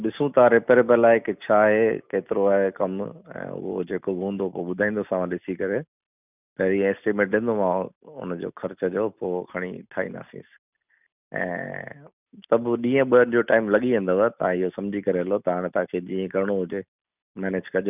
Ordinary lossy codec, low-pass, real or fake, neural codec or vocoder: none; 3.6 kHz; real; none